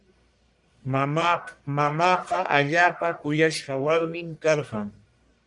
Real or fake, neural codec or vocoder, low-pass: fake; codec, 44.1 kHz, 1.7 kbps, Pupu-Codec; 10.8 kHz